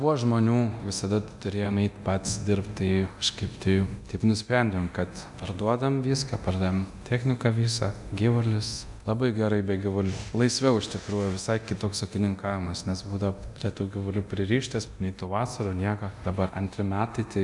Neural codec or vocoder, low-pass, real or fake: codec, 24 kHz, 0.9 kbps, DualCodec; 10.8 kHz; fake